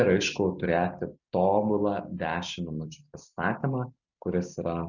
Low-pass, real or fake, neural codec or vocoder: 7.2 kHz; real; none